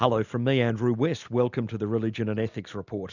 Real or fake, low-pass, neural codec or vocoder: real; 7.2 kHz; none